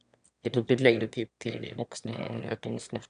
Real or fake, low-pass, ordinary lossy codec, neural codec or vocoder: fake; 9.9 kHz; none; autoencoder, 22.05 kHz, a latent of 192 numbers a frame, VITS, trained on one speaker